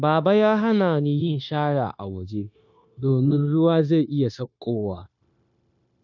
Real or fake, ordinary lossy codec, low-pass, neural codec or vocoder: fake; none; 7.2 kHz; codec, 16 kHz, 0.9 kbps, LongCat-Audio-Codec